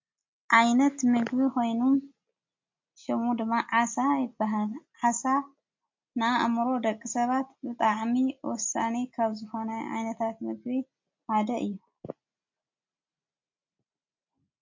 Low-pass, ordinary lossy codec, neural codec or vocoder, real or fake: 7.2 kHz; MP3, 48 kbps; none; real